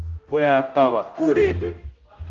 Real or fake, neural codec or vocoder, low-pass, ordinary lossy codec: fake; codec, 16 kHz, 0.5 kbps, X-Codec, HuBERT features, trained on general audio; 7.2 kHz; Opus, 24 kbps